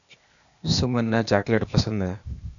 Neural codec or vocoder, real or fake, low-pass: codec, 16 kHz, 0.8 kbps, ZipCodec; fake; 7.2 kHz